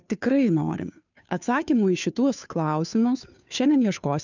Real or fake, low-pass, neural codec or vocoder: fake; 7.2 kHz; codec, 16 kHz, 2 kbps, FunCodec, trained on Chinese and English, 25 frames a second